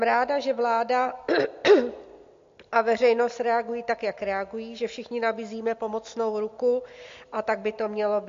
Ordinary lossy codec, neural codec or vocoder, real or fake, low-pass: MP3, 48 kbps; none; real; 7.2 kHz